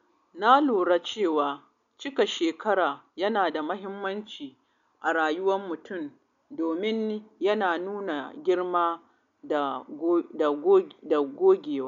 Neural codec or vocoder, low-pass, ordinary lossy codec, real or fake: none; 7.2 kHz; none; real